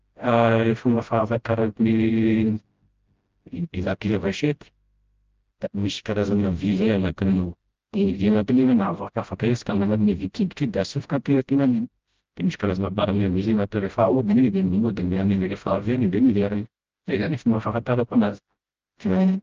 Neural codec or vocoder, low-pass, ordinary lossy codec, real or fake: codec, 16 kHz, 0.5 kbps, FreqCodec, smaller model; 7.2 kHz; Opus, 24 kbps; fake